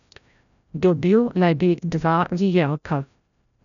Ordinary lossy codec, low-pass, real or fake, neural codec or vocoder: none; 7.2 kHz; fake; codec, 16 kHz, 0.5 kbps, FreqCodec, larger model